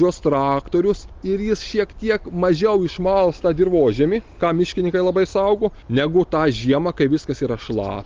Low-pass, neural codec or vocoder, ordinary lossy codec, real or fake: 7.2 kHz; none; Opus, 32 kbps; real